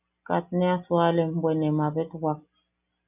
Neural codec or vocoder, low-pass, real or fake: none; 3.6 kHz; real